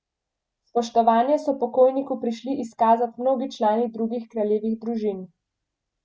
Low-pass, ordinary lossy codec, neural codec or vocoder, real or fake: none; none; none; real